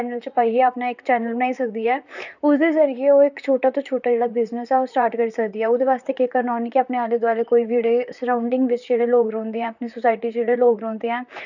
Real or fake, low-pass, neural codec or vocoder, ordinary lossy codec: fake; 7.2 kHz; vocoder, 44.1 kHz, 128 mel bands, Pupu-Vocoder; none